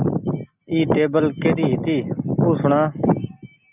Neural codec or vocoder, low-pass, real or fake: none; 3.6 kHz; real